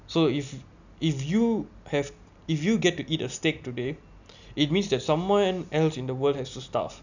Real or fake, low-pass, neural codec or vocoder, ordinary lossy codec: real; 7.2 kHz; none; none